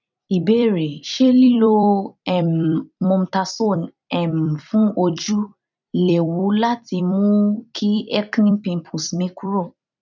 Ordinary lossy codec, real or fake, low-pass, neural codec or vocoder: none; fake; 7.2 kHz; vocoder, 44.1 kHz, 128 mel bands every 256 samples, BigVGAN v2